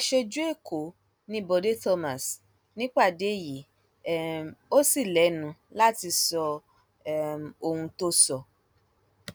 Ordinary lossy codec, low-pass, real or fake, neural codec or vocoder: none; none; real; none